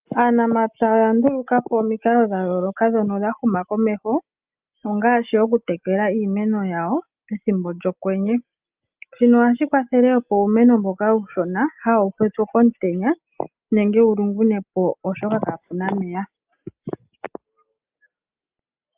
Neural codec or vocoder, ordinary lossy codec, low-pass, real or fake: none; Opus, 24 kbps; 3.6 kHz; real